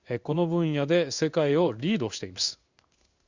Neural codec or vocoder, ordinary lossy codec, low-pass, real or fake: codec, 16 kHz in and 24 kHz out, 1 kbps, XY-Tokenizer; Opus, 64 kbps; 7.2 kHz; fake